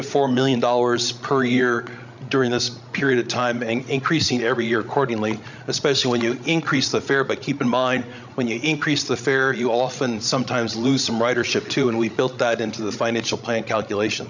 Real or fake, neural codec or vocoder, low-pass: fake; codec, 16 kHz, 16 kbps, FreqCodec, larger model; 7.2 kHz